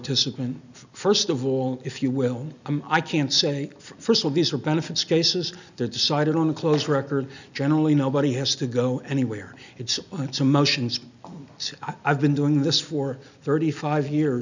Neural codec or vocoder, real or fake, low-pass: none; real; 7.2 kHz